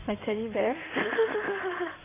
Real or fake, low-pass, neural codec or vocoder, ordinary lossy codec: fake; 3.6 kHz; codec, 16 kHz in and 24 kHz out, 2.2 kbps, FireRedTTS-2 codec; AAC, 24 kbps